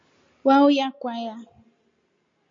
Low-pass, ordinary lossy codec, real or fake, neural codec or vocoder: 7.2 kHz; MP3, 48 kbps; real; none